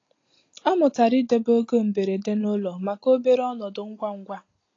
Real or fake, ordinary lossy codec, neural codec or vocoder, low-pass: real; AAC, 32 kbps; none; 7.2 kHz